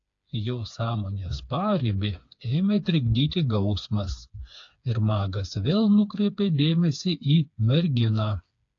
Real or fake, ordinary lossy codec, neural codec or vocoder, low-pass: fake; AAC, 48 kbps; codec, 16 kHz, 4 kbps, FreqCodec, smaller model; 7.2 kHz